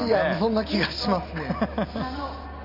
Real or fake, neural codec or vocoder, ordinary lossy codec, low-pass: real; none; MP3, 48 kbps; 5.4 kHz